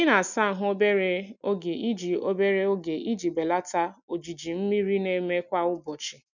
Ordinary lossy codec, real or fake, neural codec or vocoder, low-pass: none; real; none; 7.2 kHz